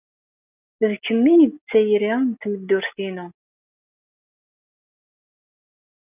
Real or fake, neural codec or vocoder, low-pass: real; none; 3.6 kHz